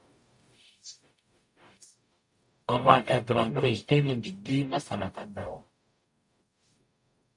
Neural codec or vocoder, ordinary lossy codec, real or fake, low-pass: codec, 44.1 kHz, 0.9 kbps, DAC; MP3, 64 kbps; fake; 10.8 kHz